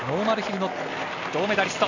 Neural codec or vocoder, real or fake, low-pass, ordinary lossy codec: none; real; 7.2 kHz; AAC, 48 kbps